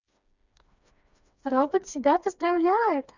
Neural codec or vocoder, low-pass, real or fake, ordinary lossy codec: codec, 16 kHz, 2 kbps, FreqCodec, smaller model; 7.2 kHz; fake; none